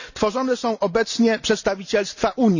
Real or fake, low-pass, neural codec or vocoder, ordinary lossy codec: real; 7.2 kHz; none; none